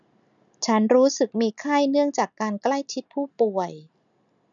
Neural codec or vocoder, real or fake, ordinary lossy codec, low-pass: none; real; none; 7.2 kHz